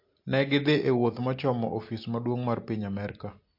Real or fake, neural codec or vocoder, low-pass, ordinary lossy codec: real; none; 5.4 kHz; MP3, 32 kbps